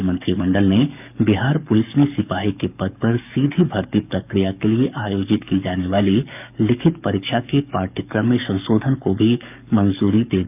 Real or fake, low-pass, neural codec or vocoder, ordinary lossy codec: fake; 3.6 kHz; codec, 44.1 kHz, 7.8 kbps, DAC; none